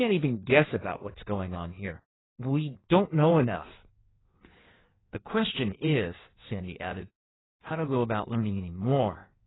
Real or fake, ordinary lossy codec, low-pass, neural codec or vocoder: fake; AAC, 16 kbps; 7.2 kHz; codec, 16 kHz in and 24 kHz out, 1.1 kbps, FireRedTTS-2 codec